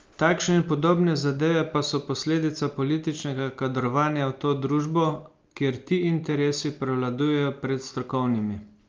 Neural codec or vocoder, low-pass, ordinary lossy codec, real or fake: none; 7.2 kHz; Opus, 32 kbps; real